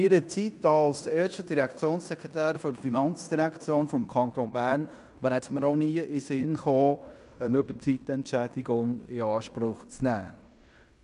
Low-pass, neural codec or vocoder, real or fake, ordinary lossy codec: 10.8 kHz; codec, 16 kHz in and 24 kHz out, 0.9 kbps, LongCat-Audio-Codec, fine tuned four codebook decoder; fake; none